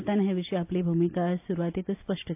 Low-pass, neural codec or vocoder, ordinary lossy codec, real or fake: 3.6 kHz; none; AAC, 32 kbps; real